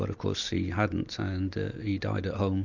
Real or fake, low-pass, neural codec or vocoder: real; 7.2 kHz; none